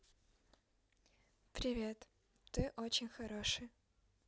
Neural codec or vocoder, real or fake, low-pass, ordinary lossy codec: none; real; none; none